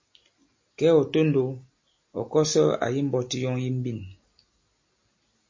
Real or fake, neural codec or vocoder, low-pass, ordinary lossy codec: real; none; 7.2 kHz; MP3, 32 kbps